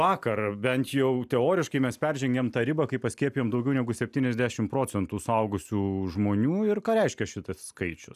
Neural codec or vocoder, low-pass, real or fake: none; 14.4 kHz; real